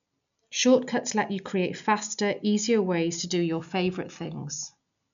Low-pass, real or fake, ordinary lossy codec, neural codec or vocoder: 7.2 kHz; real; none; none